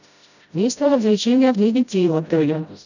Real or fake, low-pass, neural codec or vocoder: fake; 7.2 kHz; codec, 16 kHz, 0.5 kbps, FreqCodec, smaller model